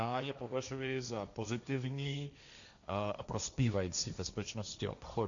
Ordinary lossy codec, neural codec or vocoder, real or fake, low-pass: MP3, 96 kbps; codec, 16 kHz, 1.1 kbps, Voila-Tokenizer; fake; 7.2 kHz